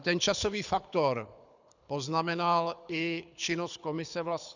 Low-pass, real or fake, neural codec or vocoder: 7.2 kHz; fake; codec, 24 kHz, 6 kbps, HILCodec